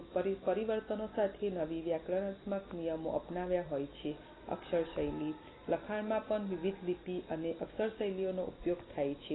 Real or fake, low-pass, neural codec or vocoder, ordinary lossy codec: real; 7.2 kHz; none; AAC, 16 kbps